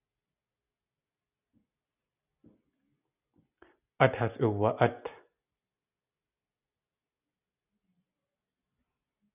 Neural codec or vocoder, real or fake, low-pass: none; real; 3.6 kHz